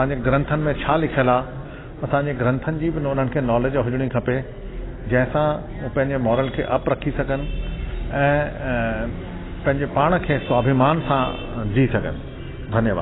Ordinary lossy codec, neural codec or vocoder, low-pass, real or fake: AAC, 16 kbps; none; 7.2 kHz; real